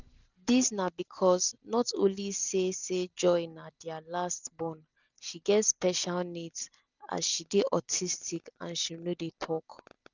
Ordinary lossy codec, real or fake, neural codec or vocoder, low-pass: none; real; none; 7.2 kHz